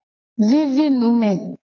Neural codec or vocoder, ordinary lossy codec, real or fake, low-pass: codec, 44.1 kHz, 2.6 kbps, SNAC; MP3, 64 kbps; fake; 7.2 kHz